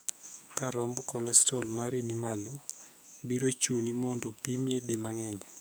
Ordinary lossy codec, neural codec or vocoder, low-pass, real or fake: none; codec, 44.1 kHz, 2.6 kbps, SNAC; none; fake